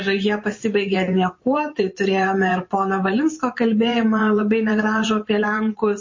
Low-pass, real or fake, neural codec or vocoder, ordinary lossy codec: 7.2 kHz; fake; vocoder, 44.1 kHz, 128 mel bands every 512 samples, BigVGAN v2; MP3, 32 kbps